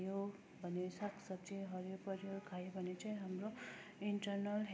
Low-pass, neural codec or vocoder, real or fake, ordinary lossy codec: none; none; real; none